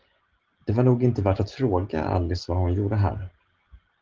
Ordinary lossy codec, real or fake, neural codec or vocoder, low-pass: Opus, 16 kbps; real; none; 7.2 kHz